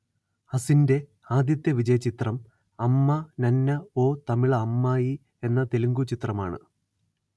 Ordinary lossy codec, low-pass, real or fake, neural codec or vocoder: none; none; real; none